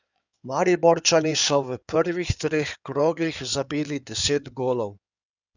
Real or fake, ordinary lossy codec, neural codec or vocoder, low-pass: fake; none; codec, 16 kHz in and 24 kHz out, 2.2 kbps, FireRedTTS-2 codec; 7.2 kHz